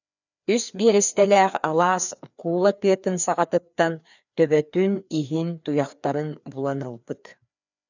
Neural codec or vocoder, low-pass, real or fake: codec, 16 kHz, 2 kbps, FreqCodec, larger model; 7.2 kHz; fake